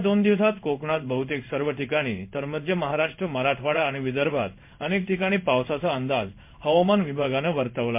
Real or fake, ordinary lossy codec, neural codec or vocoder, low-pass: fake; MP3, 32 kbps; codec, 16 kHz in and 24 kHz out, 1 kbps, XY-Tokenizer; 3.6 kHz